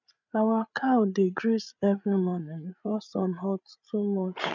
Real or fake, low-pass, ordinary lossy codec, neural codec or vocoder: fake; 7.2 kHz; none; codec, 16 kHz, 8 kbps, FreqCodec, larger model